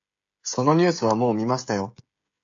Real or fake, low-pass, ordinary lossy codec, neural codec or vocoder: fake; 7.2 kHz; AAC, 64 kbps; codec, 16 kHz, 16 kbps, FreqCodec, smaller model